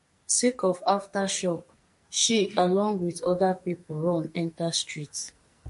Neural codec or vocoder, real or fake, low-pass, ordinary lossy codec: codec, 44.1 kHz, 2.6 kbps, SNAC; fake; 14.4 kHz; MP3, 48 kbps